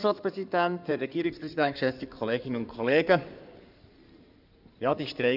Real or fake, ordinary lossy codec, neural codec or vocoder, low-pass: fake; none; codec, 16 kHz in and 24 kHz out, 2.2 kbps, FireRedTTS-2 codec; 5.4 kHz